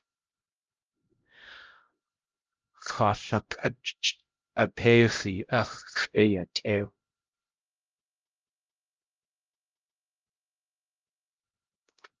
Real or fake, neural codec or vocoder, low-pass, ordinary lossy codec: fake; codec, 16 kHz, 0.5 kbps, X-Codec, HuBERT features, trained on LibriSpeech; 7.2 kHz; Opus, 24 kbps